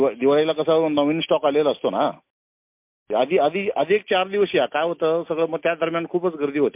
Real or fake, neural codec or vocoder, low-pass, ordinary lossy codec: real; none; 3.6 kHz; MP3, 32 kbps